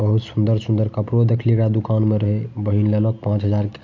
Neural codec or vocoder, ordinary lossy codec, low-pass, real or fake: none; MP3, 48 kbps; 7.2 kHz; real